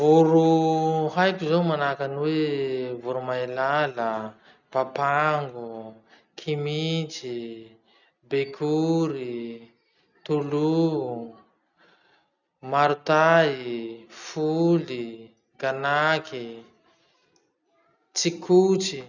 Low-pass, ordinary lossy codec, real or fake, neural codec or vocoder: 7.2 kHz; none; real; none